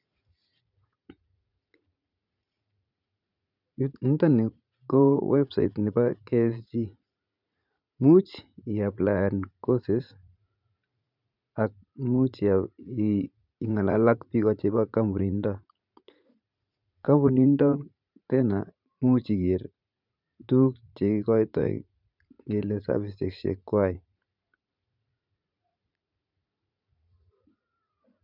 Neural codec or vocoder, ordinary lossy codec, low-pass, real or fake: vocoder, 44.1 kHz, 80 mel bands, Vocos; none; 5.4 kHz; fake